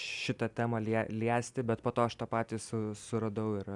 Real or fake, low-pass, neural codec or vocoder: real; 10.8 kHz; none